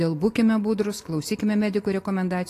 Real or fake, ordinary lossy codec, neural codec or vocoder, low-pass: real; AAC, 48 kbps; none; 14.4 kHz